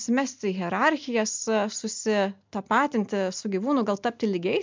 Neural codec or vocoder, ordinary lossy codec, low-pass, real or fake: none; MP3, 64 kbps; 7.2 kHz; real